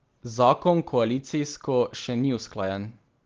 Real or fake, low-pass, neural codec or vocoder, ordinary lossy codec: real; 7.2 kHz; none; Opus, 16 kbps